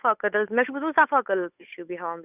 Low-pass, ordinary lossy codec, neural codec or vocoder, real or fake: 3.6 kHz; none; codec, 16 kHz, 8 kbps, FunCodec, trained on Chinese and English, 25 frames a second; fake